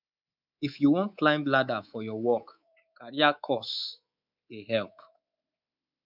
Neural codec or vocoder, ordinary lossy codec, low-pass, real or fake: codec, 24 kHz, 3.1 kbps, DualCodec; none; 5.4 kHz; fake